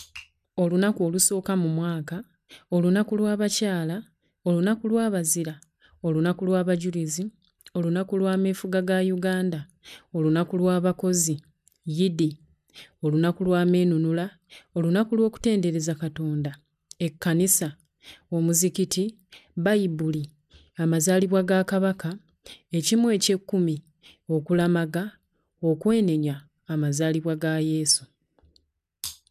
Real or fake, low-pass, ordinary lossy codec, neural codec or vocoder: real; 14.4 kHz; none; none